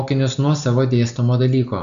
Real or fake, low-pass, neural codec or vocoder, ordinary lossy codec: real; 7.2 kHz; none; AAC, 96 kbps